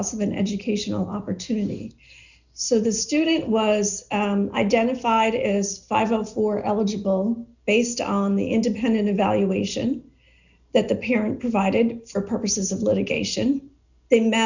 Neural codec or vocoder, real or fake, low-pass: none; real; 7.2 kHz